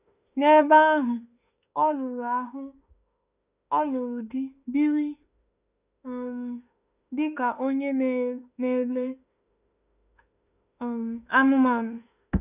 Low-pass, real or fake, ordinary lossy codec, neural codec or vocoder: 3.6 kHz; fake; none; autoencoder, 48 kHz, 32 numbers a frame, DAC-VAE, trained on Japanese speech